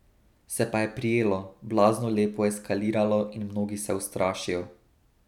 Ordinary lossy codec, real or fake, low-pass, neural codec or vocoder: none; real; 19.8 kHz; none